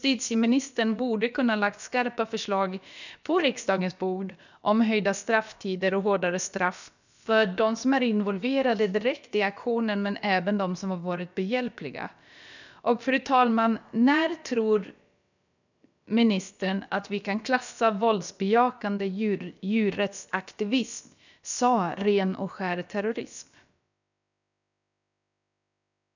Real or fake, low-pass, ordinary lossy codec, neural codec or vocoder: fake; 7.2 kHz; none; codec, 16 kHz, about 1 kbps, DyCAST, with the encoder's durations